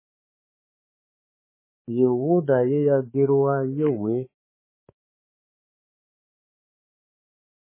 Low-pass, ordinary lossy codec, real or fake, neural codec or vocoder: 3.6 kHz; MP3, 16 kbps; fake; codec, 16 kHz, 4 kbps, X-Codec, HuBERT features, trained on balanced general audio